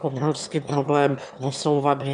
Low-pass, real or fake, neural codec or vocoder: 9.9 kHz; fake; autoencoder, 22.05 kHz, a latent of 192 numbers a frame, VITS, trained on one speaker